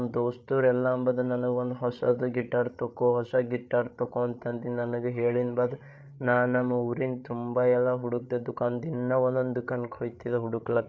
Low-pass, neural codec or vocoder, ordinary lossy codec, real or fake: none; codec, 16 kHz, 8 kbps, FreqCodec, larger model; none; fake